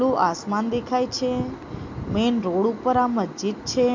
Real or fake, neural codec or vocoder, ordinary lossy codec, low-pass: real; none; MP3, 48 kbps; 7.2 kHz